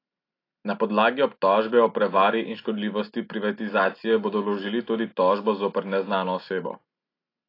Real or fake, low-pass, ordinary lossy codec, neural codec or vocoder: real; 5.4 kHz; AAC, 32 kbps; none